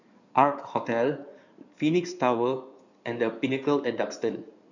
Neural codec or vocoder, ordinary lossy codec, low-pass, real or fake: codec, 16 kHz in and 24 kHz out, 2.2 kbps, FireRedTTS-2 codec; none; 7.2 kHz; fake